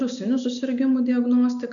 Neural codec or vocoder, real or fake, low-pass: none; real; 7.2 kHz